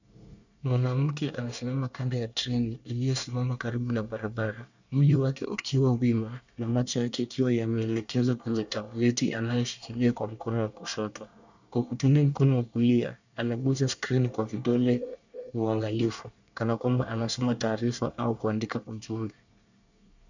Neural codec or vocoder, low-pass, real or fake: codec, 24 kHz, 1 kbps, SNAC; 7.2 kHz; fake